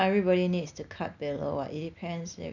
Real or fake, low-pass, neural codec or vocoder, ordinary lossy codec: real; 7.2 kHz; none; none